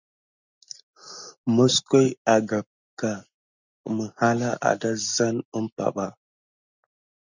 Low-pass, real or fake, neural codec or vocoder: 7.2 kHz; real; none